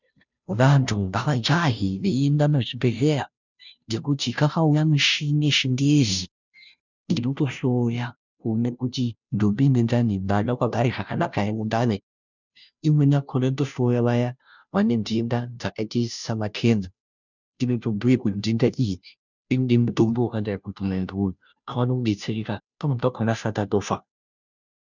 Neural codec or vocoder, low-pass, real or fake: codec, 16 kHz, 0.5 kbps, FunCodec, trained on Chinese and English, 25 frames a second; 7.2 kHz; fake